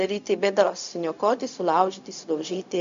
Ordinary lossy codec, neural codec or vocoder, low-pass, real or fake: MP3, 64 kbps; codec, 16 kHz, 0.4 kbps, LongCat-Audio-Codec; 7.2 kHz; fake